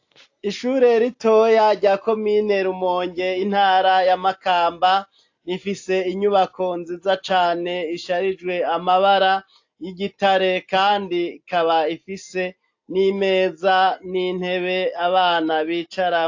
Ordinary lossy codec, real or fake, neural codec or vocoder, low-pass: AAC, 48 kbps; real; none; 7.2 kHz